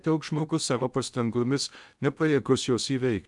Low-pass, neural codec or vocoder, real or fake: 10.8 kHz; codec, 16 kHz in and 24 kHz out, 0.6 kbps, FocalCodec, streaming, 2048 codes; fake